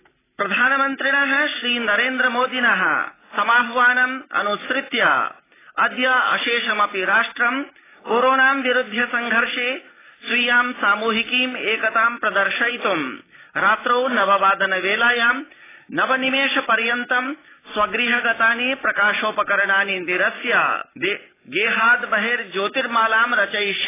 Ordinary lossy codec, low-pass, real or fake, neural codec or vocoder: AAC, 16 kbps; 3.6 kHz; real; none